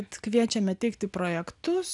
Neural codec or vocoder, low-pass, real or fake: none; 10.8 kHz; real